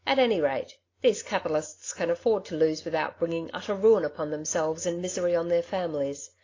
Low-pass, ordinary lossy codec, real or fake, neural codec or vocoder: 7.2 kHz; AAC, 32 kbps; real; none